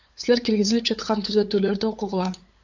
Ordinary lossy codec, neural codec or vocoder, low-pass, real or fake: AAC, 48 kbps; codec, 16 kHz, 8 kbps, FunCodec, trained on LibriTTS, 25 frames a second; 7.2 kHz; fake